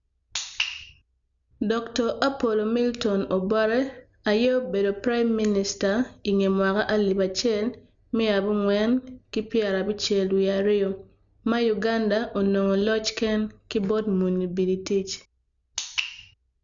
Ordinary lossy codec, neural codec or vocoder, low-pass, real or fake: none; none; 7.2 kHz; real